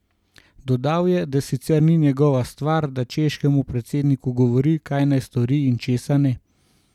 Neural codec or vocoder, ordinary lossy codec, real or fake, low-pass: none; none; real; 19.8 kHz